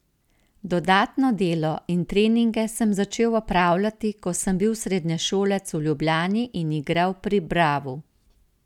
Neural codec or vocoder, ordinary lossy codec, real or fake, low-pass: none; none; real; 19.8 kHz